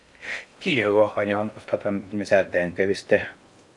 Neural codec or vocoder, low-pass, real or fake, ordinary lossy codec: codec, 16 kHz in and 24 kHz out, 0.6 kbps, FocalCodec, streaming, 4096 codes; 10.8 kHz; fake; MP3, 96 kbps